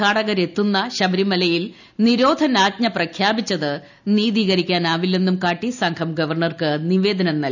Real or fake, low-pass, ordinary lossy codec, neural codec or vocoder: real; 7.2 kHz; none; none